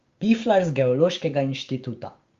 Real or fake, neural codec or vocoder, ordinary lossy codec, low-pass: fake; codec, 16 kHz, 6 kbps, DAC; Opus, 32 kbps; 7.2 kHz